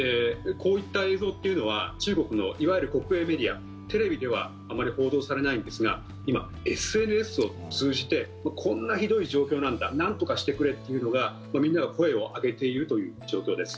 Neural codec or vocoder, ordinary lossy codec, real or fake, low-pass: none; none; real; none